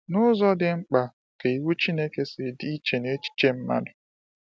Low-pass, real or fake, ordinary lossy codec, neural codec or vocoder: none; real; none; none